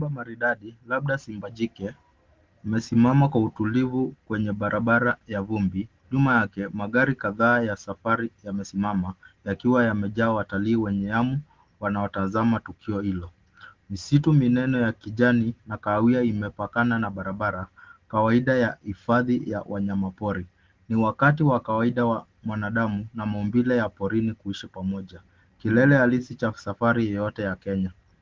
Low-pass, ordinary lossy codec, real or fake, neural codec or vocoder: 7.2 kHz; Opus, 16 kbps; real; none